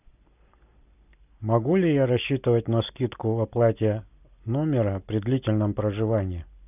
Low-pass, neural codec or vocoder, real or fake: 3.6 kHz; none; real